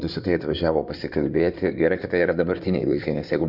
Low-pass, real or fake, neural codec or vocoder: 5.4 kHz; fake; codec, 16 kHz in and 24 kHz out, 2.2 kbps, FireRedTTS-2 codec